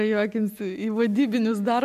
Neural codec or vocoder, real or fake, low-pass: none; real; 14.4 kHz